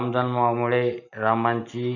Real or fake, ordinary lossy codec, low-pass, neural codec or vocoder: real; none; 7.2 kHz; none